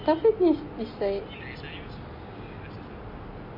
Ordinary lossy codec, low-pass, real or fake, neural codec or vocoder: MP3, 24 kbps; 5.4 kHz; real; none